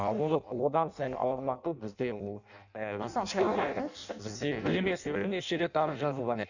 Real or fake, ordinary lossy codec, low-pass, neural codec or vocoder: fake; none; 7.2 kHz; codec, 16 kHz in and 24 kHz out, 0.6 kbps, FireRedTTS-2 codec